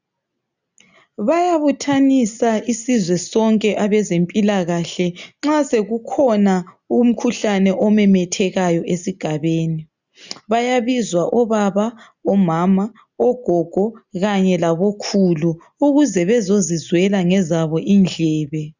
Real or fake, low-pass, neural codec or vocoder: real; 7.2 kHz; none